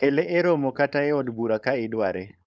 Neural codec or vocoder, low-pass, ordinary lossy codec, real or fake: codec, 16 kHz, 4.8 kbps, FACodec; none; none; fake